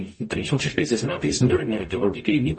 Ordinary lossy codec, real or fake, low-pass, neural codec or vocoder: MP3, 32 kbps; fake; 9.9 kHz; codec, 44.1 kHz, 0.9 kbps, DAC